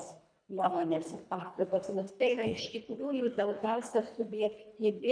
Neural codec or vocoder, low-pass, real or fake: codec, 24 kHz, 1.5 kbps, HILCodec; 9.9 kHz; fake